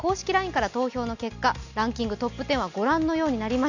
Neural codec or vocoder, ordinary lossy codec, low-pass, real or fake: none; none; 7.2 kHz; real